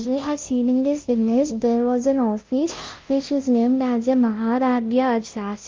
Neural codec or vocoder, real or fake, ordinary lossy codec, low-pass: codec, 16 kHz, 0.5 kbps, FunCodec, trained on Chinese and English, 25 frames a second; fake; Opus, 32 kbps; 7.2 kHz